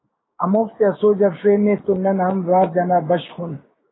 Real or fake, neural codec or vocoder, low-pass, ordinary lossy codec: fake; codec, 16 kHz, 6 kbps, DAC; 7.2 kHz; AAC, 16 kbps